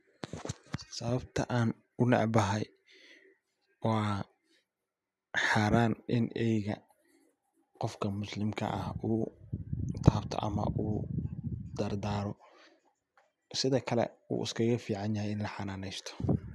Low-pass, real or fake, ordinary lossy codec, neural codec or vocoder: none; real; none; none